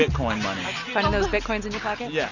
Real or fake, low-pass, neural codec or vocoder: real; 7.2 kHz; none